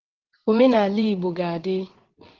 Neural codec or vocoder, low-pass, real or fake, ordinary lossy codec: vocoder, 22.05 kHz, 80 mel bands, WaveNeXt; 7.2 kHz; fake; Opus, 16 kbps